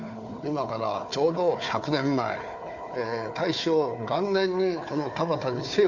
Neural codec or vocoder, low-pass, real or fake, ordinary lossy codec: codec, 16 kHz, 4 kbps, FunCodec, trained on Chinese and English, 50 frames a second; 7.2 kHz; fake; MP3, 48 kbps